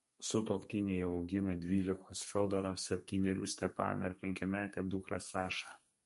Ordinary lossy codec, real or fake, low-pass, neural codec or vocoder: MP3, 48 kbps; fake; 14.4 kHz; codec, 32 kHz, 1.9 kbps, SNAC